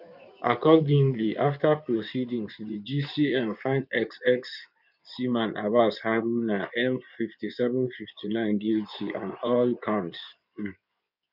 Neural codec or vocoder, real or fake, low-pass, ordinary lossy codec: codec, 16 kHz in and 24 kHz out, 2.2 kbps, FireRedTTS-2 codec; fake; 5.4 kHz; none